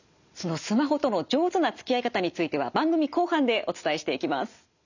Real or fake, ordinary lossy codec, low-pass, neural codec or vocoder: real; none; 7.2 kHz; none